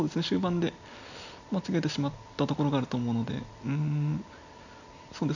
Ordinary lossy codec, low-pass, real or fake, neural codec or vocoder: none; 7.2 kHz; real; none